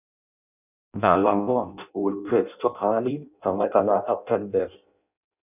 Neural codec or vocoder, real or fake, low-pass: codec, 16 kHz in and 24 kHz out, 0.6 kbps, FireRedTTS-2 codec; fake; 3.6 kHz